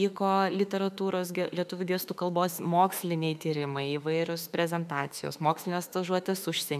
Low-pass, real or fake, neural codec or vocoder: 14.4 kHz; fake; autoencoder, 48 kHz, 32 numbers a frame, DAC-VAE, trained on Japanese speech